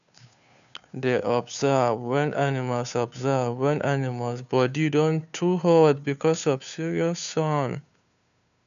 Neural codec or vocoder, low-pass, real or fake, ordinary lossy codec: codec, 16 kHz, 2 kbps, FunCodec, trained on Chinese and English, 25 frames a second; 7.2 kHz; fake; none